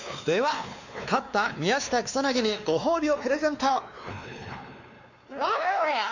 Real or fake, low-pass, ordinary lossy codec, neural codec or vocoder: fake; 7.2 kHz; none; codec, 16 kHz, 2 kbps, X-Codec, WavLM features, trained on Multilingual LibriSpeech